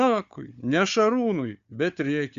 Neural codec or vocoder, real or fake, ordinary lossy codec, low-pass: codec, 16 kHz, 4 kbps, FunCodec, trained on Chinese and English, 50 frames a second; fake; Opus, 64 kbps; 7.2 kHz